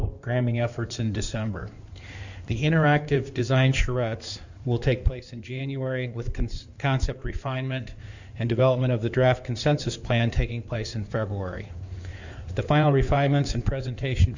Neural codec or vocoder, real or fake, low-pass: codec, 16 kHz in and 24 kHz out, 2.2 kbps, FireRedTTS-2 codec; fake; 7.2 kHz